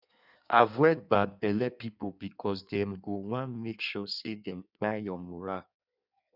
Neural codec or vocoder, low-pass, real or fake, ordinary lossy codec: codec, 16 kHz in and 24 kHz out, 1.1 kbps, FireRedTTS-2 codec; 5.4 kHz; fake; none